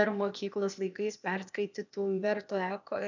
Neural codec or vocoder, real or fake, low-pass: codec, 16 kHz, 0.8 kbps, ZipCodec; fake; 7.2 kHz